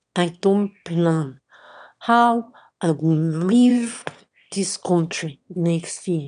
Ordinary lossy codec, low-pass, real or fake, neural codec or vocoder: none; 9.9 kHz; fake; autoencoder, 22.05 kHz, a latent of 192 numbers a frame, VITS, trained on one speaker